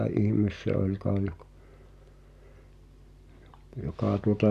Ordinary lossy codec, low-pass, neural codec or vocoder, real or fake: none; 14.4 kHz; none; real